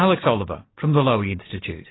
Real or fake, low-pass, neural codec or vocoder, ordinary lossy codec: real; 7.2 kHz; none; AAC, 16 kbps